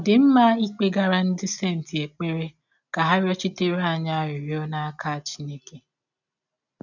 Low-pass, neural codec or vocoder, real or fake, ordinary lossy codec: 7.2 kHz; none; real; none